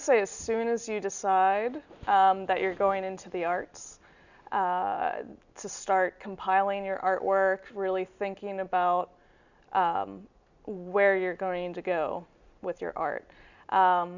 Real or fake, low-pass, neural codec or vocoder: real; 7.2 kHz; none